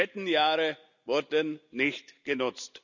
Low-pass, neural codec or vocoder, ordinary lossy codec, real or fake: 7.2 kHz; none; none; real